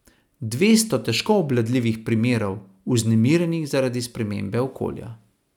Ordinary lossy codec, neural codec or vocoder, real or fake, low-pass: none; none; real; 19.8 kHz